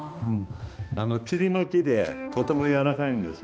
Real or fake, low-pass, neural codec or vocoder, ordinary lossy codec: fake; none; codec, 16 kHz, 2 kbps, X-Codec, HuBERT features, trained on balanced general audio; none